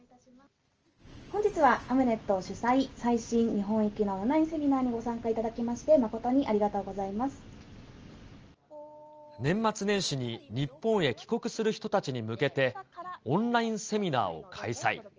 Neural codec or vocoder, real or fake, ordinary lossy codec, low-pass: none; real; Opus, 24 kbps; 7.2 kHz